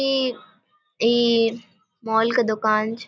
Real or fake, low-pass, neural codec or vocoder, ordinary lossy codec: real; none; none; none